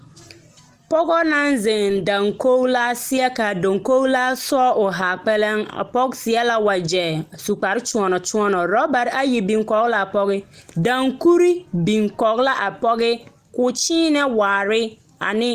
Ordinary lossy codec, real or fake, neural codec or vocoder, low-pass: Opus, 24 kbps; real; none; 14.4 kHz